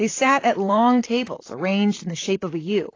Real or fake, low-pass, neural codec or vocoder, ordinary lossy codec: fake; 7.2 kHz; vocoder, 44.1 kHz, 128 mel bands, Pupu-Vocoder; AAC, 32 kbps